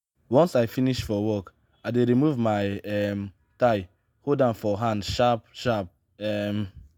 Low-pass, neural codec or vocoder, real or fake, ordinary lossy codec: none; none; real; none